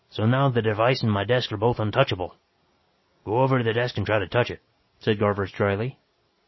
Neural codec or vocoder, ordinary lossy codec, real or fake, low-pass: none; MP3, 24 kbps; real; 7.2 kHz